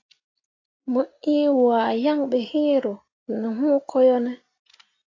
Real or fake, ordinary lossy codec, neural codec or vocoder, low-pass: real; AAC, 32 kbps; none; 7.2 kHz